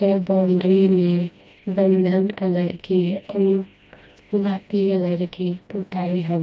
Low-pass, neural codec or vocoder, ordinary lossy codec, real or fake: none; codec, 16 kHz, 1 kbps, FreqCodec, smaller model; none; fake